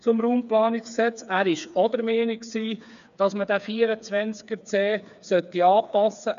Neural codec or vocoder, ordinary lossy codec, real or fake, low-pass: codec, 16 kHz, 4 kbps, FreqCodec, smaller model; none; fake; 7.2 kHz